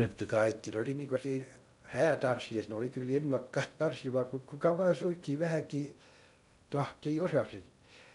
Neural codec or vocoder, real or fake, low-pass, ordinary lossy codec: codec, 16 kHz in and 24 kHz out, 0.6 kbps, FocalCodec, streaming, 2048 codes; fake; 10.8 kHz; none